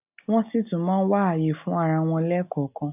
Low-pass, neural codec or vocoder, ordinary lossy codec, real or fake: 3.6 kHz; none; none; real